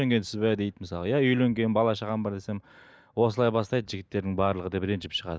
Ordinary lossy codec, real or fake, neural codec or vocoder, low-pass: none; real; none; none